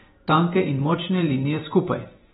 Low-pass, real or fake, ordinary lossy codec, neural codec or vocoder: 19.8 kHz; fake; AAC, 16 kbps; vocoder, 48 kHz, 128 mel bands, Vocos